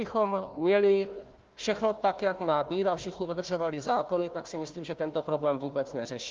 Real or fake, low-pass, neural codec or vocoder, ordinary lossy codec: fake; 7.2 kHz; codec, 16 kHz, 1 kbps, FunCodec, trained on Chinese and English, 50 frames a second; Opus, 32 kbps